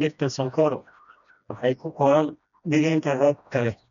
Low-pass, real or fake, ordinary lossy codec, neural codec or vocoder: 7.2 kHz; fake; none; codec, 16 kHz, 1 kbps, FreqCodec, smaller model